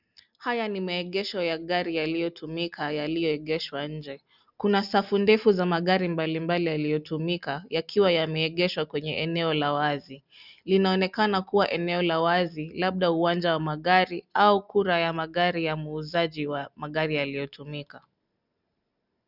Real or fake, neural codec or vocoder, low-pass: real; none; 5.4 kHz